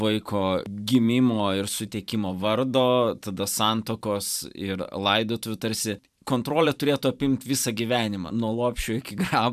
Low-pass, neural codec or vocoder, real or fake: 14.4 kHz; vocoder, 44.1 kHz, 128 mel bands every 512 samples, BigVGAN v2; fake